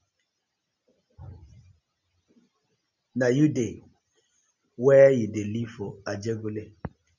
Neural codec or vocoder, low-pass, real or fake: none; 7.2 kHz; real